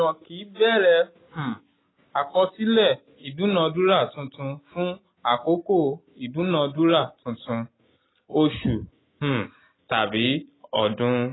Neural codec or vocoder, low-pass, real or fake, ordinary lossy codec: none; 7.2 kHz; real; AAC, 16 kbps